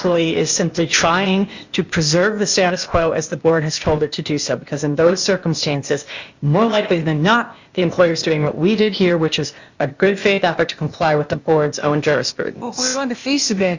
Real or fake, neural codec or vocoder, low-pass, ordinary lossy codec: fake; codec, 16 kHz, 0.8 kbps, ZipCodec; 7.2 kHz; Opus, 64 kbps